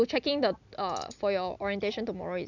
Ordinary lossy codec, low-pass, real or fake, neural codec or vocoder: none; 7.2 kHz; real; none